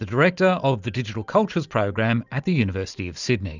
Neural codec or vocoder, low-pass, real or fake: none; 7.2 kHz; real